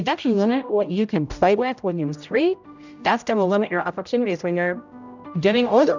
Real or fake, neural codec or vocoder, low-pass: fake; codec, 16 kHz, 0.5 kbps, X-Codec, HuBERT features, trained on general audio; 7.2 kHz